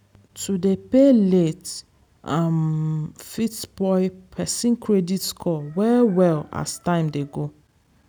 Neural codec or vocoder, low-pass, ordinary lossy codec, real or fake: none; none; none; real